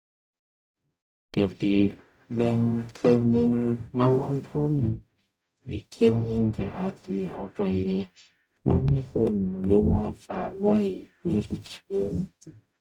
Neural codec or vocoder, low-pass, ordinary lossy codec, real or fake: codec, 44.1 kHz, 0.9 kbps, DAC; 19.8 kHz; none; fake